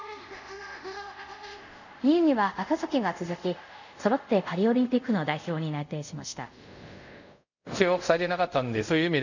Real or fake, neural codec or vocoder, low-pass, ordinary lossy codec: fake; codec, 24 kHz, 0.5 kbps, DualCodec; 7.2 kHz; none